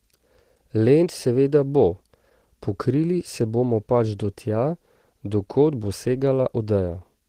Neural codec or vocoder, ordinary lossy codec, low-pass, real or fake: none; Opus, 16 kbps; 14.4 kHz; real